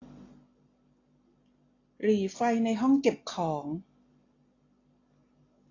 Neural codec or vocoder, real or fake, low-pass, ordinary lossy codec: none; real; 7.2 kHz; AAC, 32 kbps